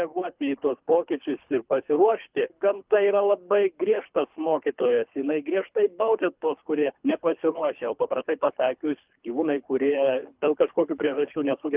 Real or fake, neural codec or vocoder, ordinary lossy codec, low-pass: fake; codec, 24 kHz, 3 kbps, HILCodec; Opus, 24 kbps; 3.6 kHz